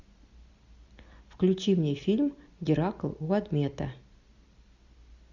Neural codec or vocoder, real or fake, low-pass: none; real; 7.2 kHz